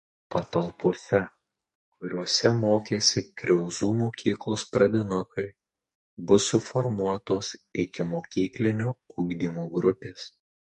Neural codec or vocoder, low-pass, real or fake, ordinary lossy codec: codec, 44.1 kHz, 2.6 kbps, SNAC; 14.4 kHz; fake; MP3, 48 kbps